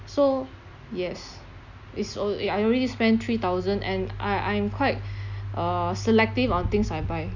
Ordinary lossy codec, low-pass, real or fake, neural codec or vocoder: none; 7.2 kHz; real; none